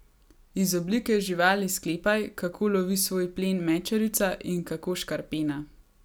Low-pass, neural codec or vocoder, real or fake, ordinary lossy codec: none; none; real; none